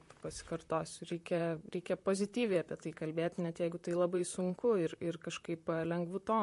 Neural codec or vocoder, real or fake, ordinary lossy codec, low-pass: vocoder, 44.1 kHz, 128 mel bands, Pupu-Vocoder; fake; MP3, 48 kbps; 14.4 kHz